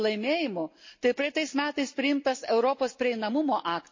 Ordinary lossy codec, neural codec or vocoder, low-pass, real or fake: MP3, 32 kbps; none; 7.2 kHz; real